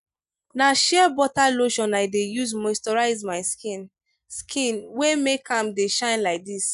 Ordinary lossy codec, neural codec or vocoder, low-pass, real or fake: MP3, 96 kbps; none; 10.8 kHz; real